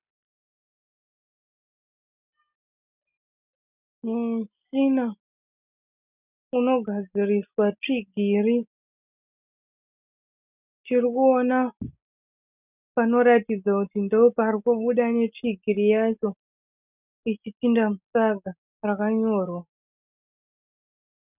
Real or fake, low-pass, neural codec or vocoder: real; 3.6 kHz; none